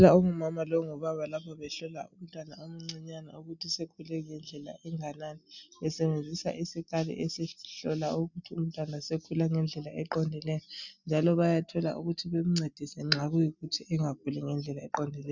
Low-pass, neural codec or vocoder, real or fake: 7.2 kHz; none; real